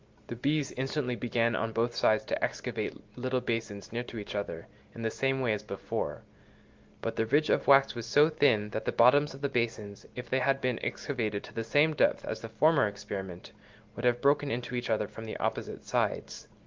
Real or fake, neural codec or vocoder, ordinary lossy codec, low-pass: real; none; Opus, 32 kbps; 7.2 kHz